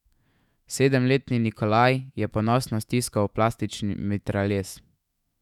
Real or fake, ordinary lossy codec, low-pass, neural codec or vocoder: fake; none; 19.8 kHz; autoencoder, 48 kHz, 128 numbers a frame, DAC-VAE, trained on Japanese speech